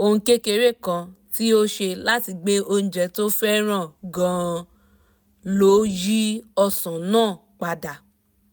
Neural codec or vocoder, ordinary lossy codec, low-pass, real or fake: none; none; none; real